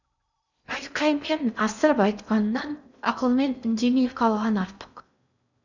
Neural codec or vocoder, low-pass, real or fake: codec, 16 kHz in and 24 kHz out, 0.6 kbps, FocalCodec, streaming, 2048 codes; 7.2 kHz; fake